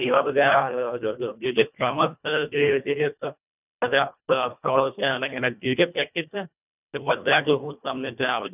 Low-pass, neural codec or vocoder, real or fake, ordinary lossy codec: 3.6 kHz; codec, 24 kHz, 1.5 kbps, HILCodec; fake; none